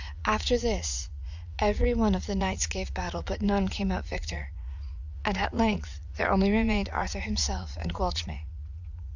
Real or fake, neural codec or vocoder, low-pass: fake; vocoder, 44.1 kHz, 80 mel bands, Vocos; 7.2 kHz